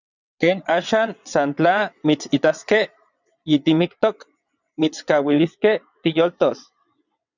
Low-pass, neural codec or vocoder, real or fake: 7.2 kHz; vocoder, 22.05 kHz, 80 mel bands, WaveNeXt; fake